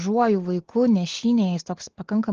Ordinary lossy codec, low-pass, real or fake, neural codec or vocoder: Opus, 16 kbps; 7.2 kHz; real; none